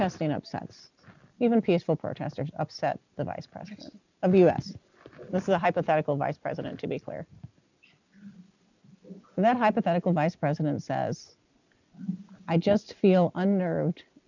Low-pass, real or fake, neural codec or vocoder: 7.2 kHz; real; none